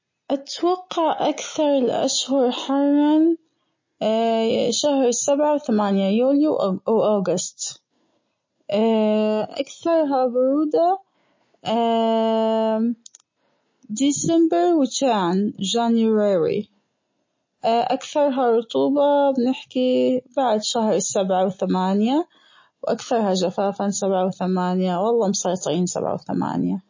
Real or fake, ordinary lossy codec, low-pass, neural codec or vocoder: real; MP3, 32 kbps; 7.2 kHz; none